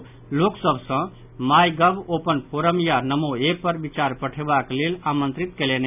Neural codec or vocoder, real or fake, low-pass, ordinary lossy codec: none; real; 3.6 kHz; none